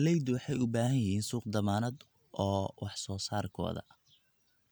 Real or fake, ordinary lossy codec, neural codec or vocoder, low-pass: real; none; none; none